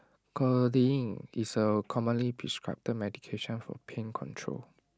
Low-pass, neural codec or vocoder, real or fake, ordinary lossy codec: none; none; real; none